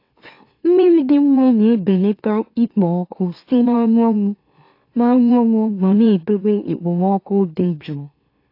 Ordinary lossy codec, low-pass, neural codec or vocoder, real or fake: AAC, 32 kbps; 5.4 kHz; autoencoder, 44.1 kHz, a latent of 192 numbers a frame, MeloTTS; fake